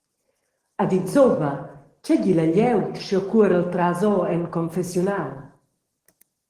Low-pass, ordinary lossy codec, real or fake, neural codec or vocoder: 14.4 kHz; Opus, 16 kbps; fake; codec, 44.1 kHz, 7.8 kbps, DAC